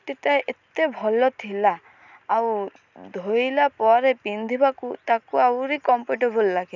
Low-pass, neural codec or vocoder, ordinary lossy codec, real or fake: 7.2 kHz; none; none; real